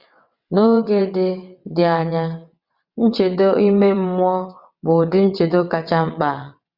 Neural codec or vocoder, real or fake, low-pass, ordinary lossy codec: vocoder, 22.05 kHz, 80 mel bands, WaveNeXt; fake; 5.4 kHz; Opus, 64 kbps